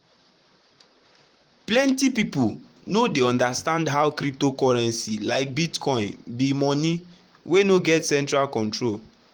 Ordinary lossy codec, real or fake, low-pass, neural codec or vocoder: Opus, 32 kbps; fake; 19.8 kHz; vocoder, 44.1 kHz, 128 mel bands, Pupu-Vocoder